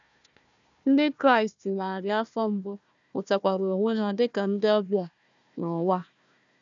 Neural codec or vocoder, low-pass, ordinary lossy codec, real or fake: codec, 16 kHz, 1 kbps, FunCodec, trained on Chinese and English, 50 frames a second; 7.2 kHz; none; fake